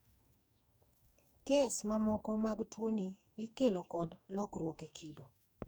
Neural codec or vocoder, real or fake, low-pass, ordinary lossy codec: codec, 44.1 kHz, 2.6 kbps, DAC; fake; none; none